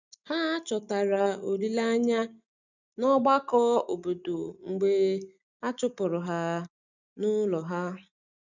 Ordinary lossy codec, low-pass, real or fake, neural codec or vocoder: none; 7.2 kHz; real; none